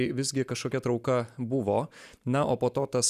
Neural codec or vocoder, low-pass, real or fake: none; 14.4 kHz; real